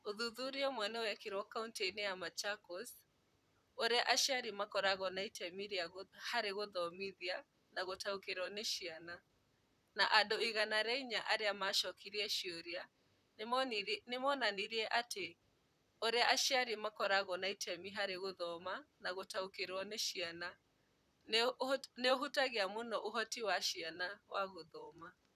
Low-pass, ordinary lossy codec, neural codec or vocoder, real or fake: 14.4 kHz; none; vocoder, 44.1 kHz, 128 mel bands, Pupu-Vocoder; fake